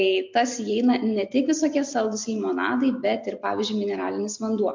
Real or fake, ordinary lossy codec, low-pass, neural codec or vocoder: real; MP3, 48 kbps; 7.2 kHz; none